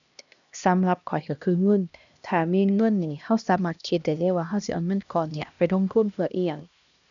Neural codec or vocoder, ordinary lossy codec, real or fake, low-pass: codec, 16 kHz, 1 kbps, X-Codec, HuBERT features, trained on LibriSpeech; none; fake; 7.2 kHz